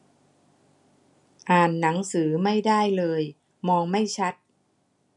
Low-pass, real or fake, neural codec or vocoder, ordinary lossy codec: 10.8 kHz; real; none; none